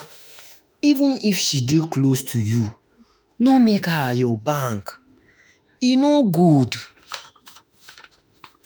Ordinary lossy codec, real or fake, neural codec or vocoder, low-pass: none; fake; autoencoder, 48 kHz, 32 numbers a frame, DAC-VAE, trained on Japanese speech; none